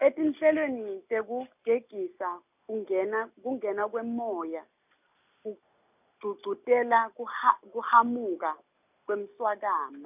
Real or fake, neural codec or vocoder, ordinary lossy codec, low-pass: real; none; none; 3.6 kHz